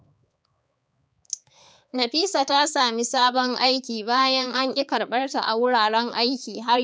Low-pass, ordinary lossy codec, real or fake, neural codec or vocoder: none; none; fake; codec, 16 kHz, 4 kbps, X-Codec, HuBERT features, trained on balanced general audio